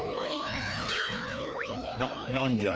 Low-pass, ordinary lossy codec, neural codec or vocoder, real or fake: none; none; codec, 16 kHz, 2 kbps, FreqCodec, larger model; fake